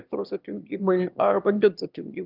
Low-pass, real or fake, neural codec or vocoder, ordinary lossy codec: 5.4 kHz; fake; autoencoder, 22.05 kHz, a latent of 192 numbers a frame, VITS, trained on one speaker; Opus, 32 kbps